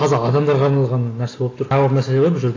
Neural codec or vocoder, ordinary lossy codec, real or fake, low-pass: none; AAC, 32 kbps; real; 7.2 kHz